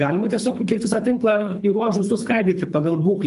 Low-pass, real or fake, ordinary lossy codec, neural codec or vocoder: 10.8 kHz; fake; MP3, 96 kbps; codec, 24 kHz, 3 kbps, HILCodec